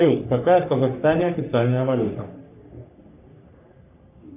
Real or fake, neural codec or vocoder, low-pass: fake; codec, 44.1 kHz, 3.4 kbps, Pupu-Codec; 3.6 kHz